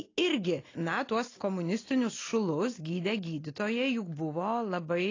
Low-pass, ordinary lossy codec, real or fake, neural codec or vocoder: 7.2 kHz; AAC, 32 kbps; real; none